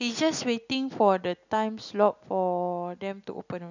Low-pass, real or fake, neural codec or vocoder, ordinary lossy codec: 7.2 kHz; real; none; none